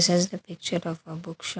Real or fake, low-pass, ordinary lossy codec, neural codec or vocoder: real; none; none; none